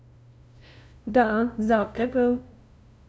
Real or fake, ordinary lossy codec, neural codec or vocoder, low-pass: fake; none; codec, 16 kHz, 0.5 kbps, FunCodec, trained on LibriTTS, 25 frames a second; none